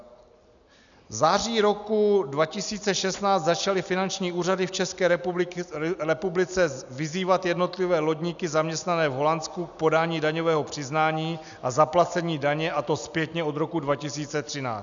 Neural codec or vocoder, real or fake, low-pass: none; real; 7.2 kHz